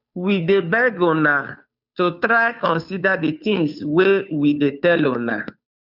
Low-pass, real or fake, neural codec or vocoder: 5.4 kHz; fake; codec, 16 kHz, 2 kbps, FunCodec, trained on Chinese and English, 25 frames a second